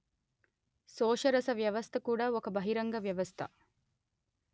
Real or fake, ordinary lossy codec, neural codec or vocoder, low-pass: real; none; none; none